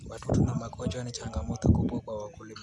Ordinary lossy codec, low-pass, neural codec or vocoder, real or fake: none; none; none; real